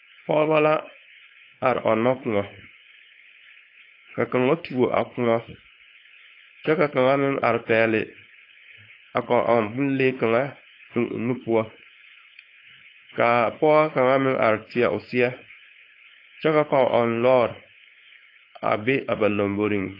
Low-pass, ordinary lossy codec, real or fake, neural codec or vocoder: 5.4 kHz; AAC, 32 kbps; fake; codec, 16 kHz, 4.8 kbps, FACodec